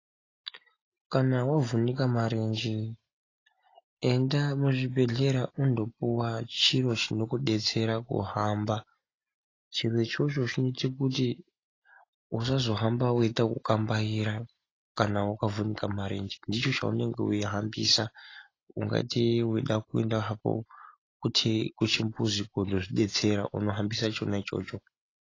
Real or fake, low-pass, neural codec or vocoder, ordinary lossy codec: real; 7.2 kHz; none; AAC, 32 kbps